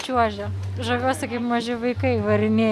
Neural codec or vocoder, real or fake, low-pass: none; real; 14.4 kHz